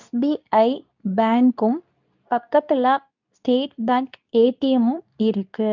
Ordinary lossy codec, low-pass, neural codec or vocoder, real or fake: none; 7.2 kHz; codec, 24 kHz, 0.9 kbps, WavTokenizer, medium speech release version 2; fake